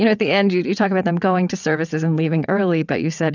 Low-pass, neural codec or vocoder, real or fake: 7.2 kHz; vocoder, 22.05 kHz, 80 mel bands, WaveNeXt; fake